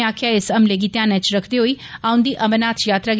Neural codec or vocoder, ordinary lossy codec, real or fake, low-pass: none; none; real; none